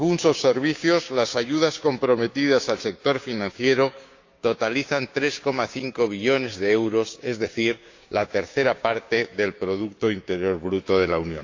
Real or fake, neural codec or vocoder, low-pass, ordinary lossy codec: fake; codec, 16 kHz, 6 kbps, DAC; 7.2 kHz; AAC, 48 kbps